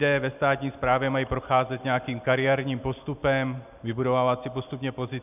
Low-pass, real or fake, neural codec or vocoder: 3.6 kHz; real; none